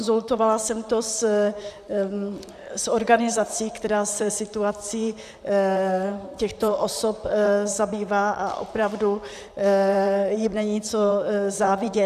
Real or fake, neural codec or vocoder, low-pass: fake; vocoder, 44.1 kHz, 128 mel bands, Pupu-Vocoder; 14.4 kHz